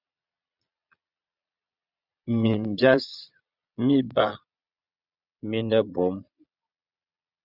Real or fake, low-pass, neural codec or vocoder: fake; 5.4 kHz; vocoder, 22.05 kHz, 80 mel bands, Vocos